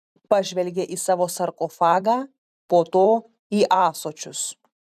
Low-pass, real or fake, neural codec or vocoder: 14.4 kHz; fake; vocoder, 44.1 kHz, 128 mel bands every 512 samples, BigVGAN v2